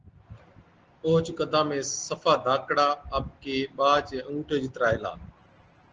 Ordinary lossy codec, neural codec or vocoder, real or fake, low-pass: Opus, 16 kbps; none; real; 7.2 kHz